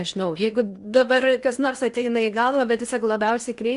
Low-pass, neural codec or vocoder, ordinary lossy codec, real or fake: 10.8 kHz; codec, 16 kHz in and 24 kHz out, 0.8 kbps, FocalCodec, streaming, 65536 codes; Opus, 64 kbps; fake